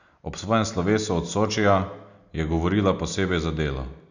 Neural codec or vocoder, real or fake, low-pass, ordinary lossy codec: none; real; 7.2 kHz; none